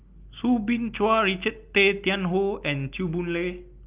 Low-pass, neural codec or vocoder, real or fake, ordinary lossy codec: 3.6 kHz; none; real; Opus, 32 kbps